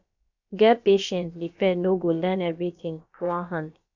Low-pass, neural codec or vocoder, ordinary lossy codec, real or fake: 7.2 kHz; codec, 16 kHz, about 1 kbps, DyCAST, with the encoder's durations; none; fake